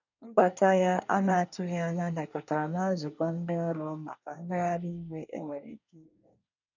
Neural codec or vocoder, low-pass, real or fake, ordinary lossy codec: codec, 24 kHz, 1 kbps, SNAC; 7.2 kHz; fake; none